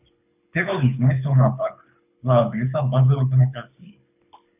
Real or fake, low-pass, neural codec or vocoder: fake; 3.6 kHz; codec, 24 kHz, 6 kbps, HILCodec